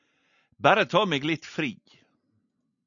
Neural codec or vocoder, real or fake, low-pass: none; real; 7.2 kHz